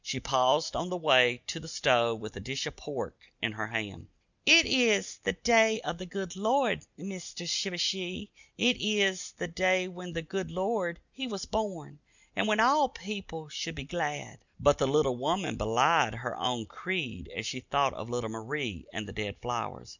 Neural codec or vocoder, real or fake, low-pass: none; real; 7.2 kHz